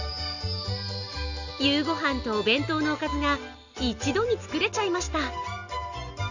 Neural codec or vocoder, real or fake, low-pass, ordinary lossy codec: none; real; 7.2 kHz; none